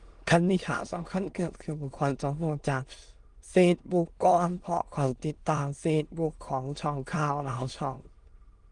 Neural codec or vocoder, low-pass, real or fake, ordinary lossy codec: autoencoder, 22.05 kHz, a latent of 192 numbers a frame, VITS, trained on many speakers; 9.9 kHz; fake; Opus, 24 kbps